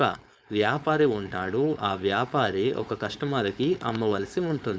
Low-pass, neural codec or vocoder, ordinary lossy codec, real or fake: none; codec, 16 kHz, 4.8 kbps, FACodec; none; fake